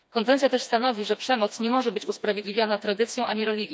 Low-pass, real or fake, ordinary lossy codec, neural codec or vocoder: none; fake; none; codec, 16 kHz, 2 kbps, FreqCodec, smaller model